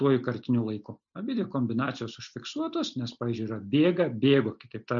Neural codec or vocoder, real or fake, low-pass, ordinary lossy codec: none; real; 7.2 kHz; MP3, 64 kbps